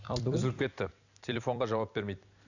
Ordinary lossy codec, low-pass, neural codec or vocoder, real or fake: none; 7.2 kHz; vocoder, 44.1 kHz, 128 mel bands every 256 samples, BigVGAN v2; fake